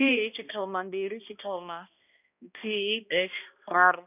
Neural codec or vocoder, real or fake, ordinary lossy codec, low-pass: codec, 16 kHz, 1 kbps, X-Codec, HuBERT features, trained on balanced general audio; fake; none; 3.6 kHz